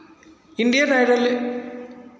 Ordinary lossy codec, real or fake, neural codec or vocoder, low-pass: none; real; none; none